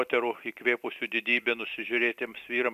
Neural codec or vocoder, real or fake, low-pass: vocoder, 44.1 kHz, 128 mel bands every 256 samples, BigVGAN v2; fake; 14.4 kHz